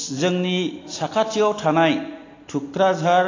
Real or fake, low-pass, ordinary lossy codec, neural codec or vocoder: real; 7.2 kHz; AAC, 32 kbps; none